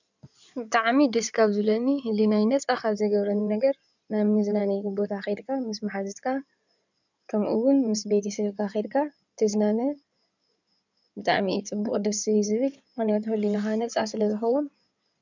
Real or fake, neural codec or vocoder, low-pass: fake; codec, 16 kHz in and 24 kHz out, 2.2 kbps, FireRedTTS-2 codec; 7.2 kHz